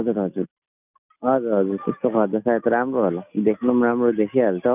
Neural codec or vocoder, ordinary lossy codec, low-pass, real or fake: none; none; 3.6 kHz; real